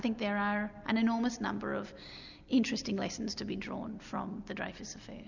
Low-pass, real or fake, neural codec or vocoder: 7.2 kHz; real; none